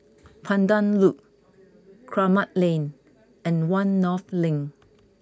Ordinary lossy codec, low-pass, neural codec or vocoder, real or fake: none; none; none; real